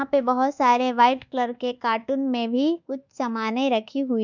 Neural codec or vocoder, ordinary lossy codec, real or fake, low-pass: codec, 24 kHz, 1.2 kbps, DualCodec; none; fake; 7.2 kHz